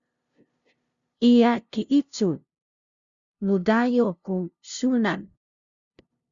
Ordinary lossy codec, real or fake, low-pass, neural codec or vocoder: Opus, 64 kbps; fake; 7.2 kHz; codec, 16 kHz, 0.5 kbps, FunCodec, trained on LibriTTS, 25 frames a second